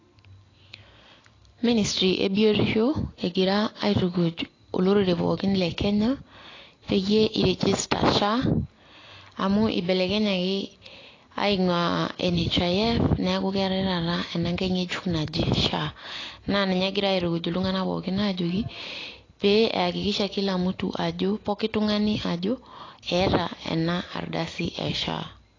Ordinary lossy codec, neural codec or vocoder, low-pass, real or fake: AAC, 32 kbps; none; 7.2 kHz; real